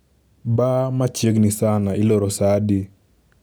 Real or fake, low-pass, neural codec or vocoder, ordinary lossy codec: real; none; none; none